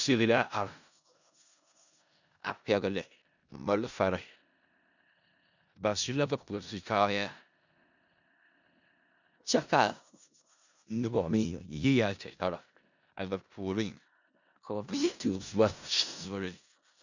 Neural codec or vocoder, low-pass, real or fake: codec, 16 kHz in and 24 kHz out, 0.4 kbps, LongCat-Audio-Codec, four codebook decoder; 7.2 kHz; fake